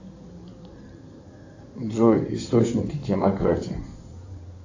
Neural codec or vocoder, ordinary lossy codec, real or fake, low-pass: codec, 16 kHz in and 24 kHz out, 2.2 kbps, FireRedTTS-2 codec; AAC, 48 kbps; fake; 7.2 kHz